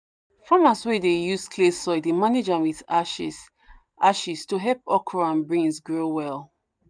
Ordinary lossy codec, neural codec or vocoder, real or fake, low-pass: none; none; real; 9.9 kHz